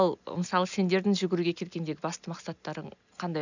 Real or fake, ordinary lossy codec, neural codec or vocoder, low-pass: real; none; none; 7.2 kHz